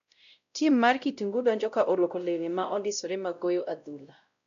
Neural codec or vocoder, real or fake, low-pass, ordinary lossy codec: codec, 16 kHz, 0.5 kbps, X-Codec, WavLM features, trained on Multilingual LibriSpeech; fake; 7.2 kHz; MP3, 96 kbps